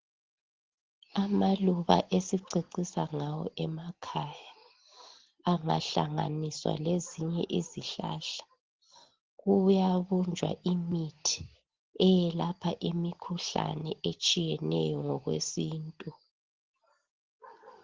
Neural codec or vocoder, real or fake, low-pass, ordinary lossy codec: none; real; 7.2 kHz; Opus, 16 kbps